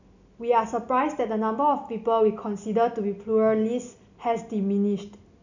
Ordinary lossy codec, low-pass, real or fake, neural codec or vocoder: none; 7.2 kHz; real; none